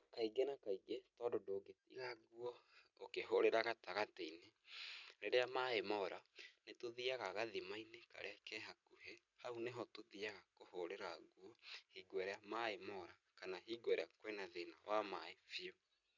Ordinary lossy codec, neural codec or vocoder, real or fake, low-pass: none; none; real; 7.2 kHz